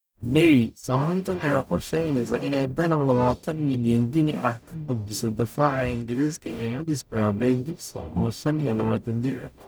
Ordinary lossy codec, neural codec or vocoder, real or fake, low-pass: none; codec, 44.1 kHz, 0.9 kbps, DAC; fake; none